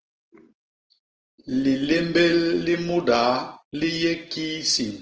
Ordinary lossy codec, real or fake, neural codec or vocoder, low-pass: Opus, 16 kbps; real; none; 7.2 kHz